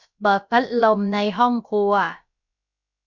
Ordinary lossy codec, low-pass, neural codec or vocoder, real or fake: none; 7.2 kHz; codec, 16 kHz, about 1 kbps, DyCAST, with the encoder's durations; fake